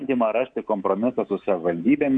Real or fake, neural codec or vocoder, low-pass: fake; codec, 24 kHz, 3.1 kbps, DualCodec; 9.9 kHz